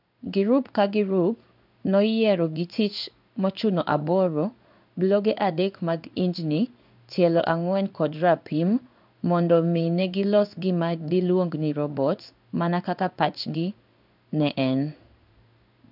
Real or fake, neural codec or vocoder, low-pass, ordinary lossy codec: fake; codec, 16 kHz in and 24 kHz out, 1 kbps, XY-Tokenizer; 5.4 kHz; none